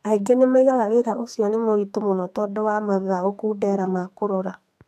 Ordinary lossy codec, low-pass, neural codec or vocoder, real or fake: none; 14.4 kHz; codec, 32 kHz, 1.9 kbps, SNAC; fake